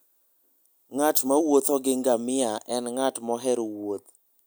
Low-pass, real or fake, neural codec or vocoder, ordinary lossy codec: none; real; none; none